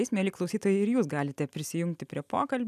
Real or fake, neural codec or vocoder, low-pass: real; none; 14.4 kHz